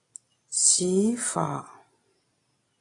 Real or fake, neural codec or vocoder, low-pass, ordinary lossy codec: real; none; 10.8 kHz; AAC, 32 kbps